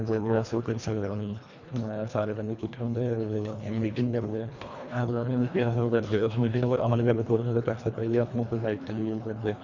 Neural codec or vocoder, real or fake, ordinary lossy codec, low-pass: codec, 24 kHz, 1.5 kbps, HILCodec; fake; Opus, 64 kbps; 7.2 kHz